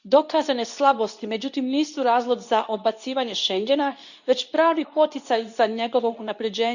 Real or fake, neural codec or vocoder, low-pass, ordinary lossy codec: fake; codec, 24 kHz, 0.9 kbps, WavTokenizer, medium speech release version 2; 7.2 kHz; none